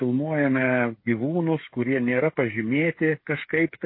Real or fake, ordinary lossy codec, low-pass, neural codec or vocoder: fake; MP3, 24 kbps; 5.4 kHz; codec, 16 kHz, 8 kbps, FreqCodec, smaller model